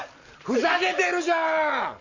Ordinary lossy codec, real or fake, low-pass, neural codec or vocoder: Opus, 64 kbps; real; 7.2 kHz; none